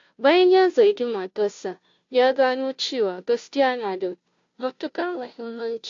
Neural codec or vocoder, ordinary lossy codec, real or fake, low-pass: codec, 16 kHz, 0.5 kbps, FunCodec, trained on Chinese and English, 25 frames a second; AAC, 48 kbps; fake; 7.2 kHz